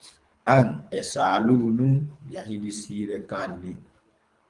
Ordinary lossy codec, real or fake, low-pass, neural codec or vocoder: Opus, 32 kbps; fake; 10.8 kHz; codec, 24 kHz, 3 kbps, HILCodec